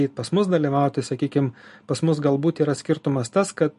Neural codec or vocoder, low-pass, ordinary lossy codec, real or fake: none; 14.4 kHz; MP3, 48 kbps; real